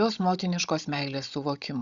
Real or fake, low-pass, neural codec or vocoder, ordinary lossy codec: fake; 7.2 kHz; codec, 16 kHz, 16 kbps, FunCodec, trained on Chinese and English, 50 frames a second; Opus, 64 kbps